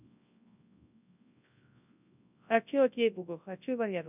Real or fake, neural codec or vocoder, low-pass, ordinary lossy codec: fake; codec, 24 kHz, 0.9 kbps, WavTokenizer, large speech release; 3.6 kHz; none